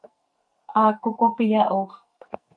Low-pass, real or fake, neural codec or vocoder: 9.9 kHz; fake; codec, 44.1 kHz, 2.6 kbps, SNAC